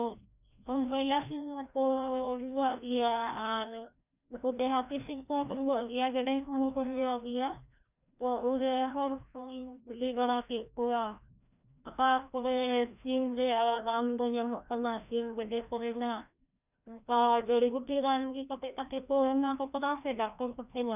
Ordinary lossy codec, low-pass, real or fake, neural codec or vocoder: none; 3.6 kHz; fake; codec, 16 kHz, 1 kbps, FreqCodec, larger model